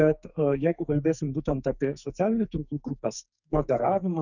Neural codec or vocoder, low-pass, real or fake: codec, 44.1 kHz, 2.6 kbps, SNAC; 7.2 kHz; fake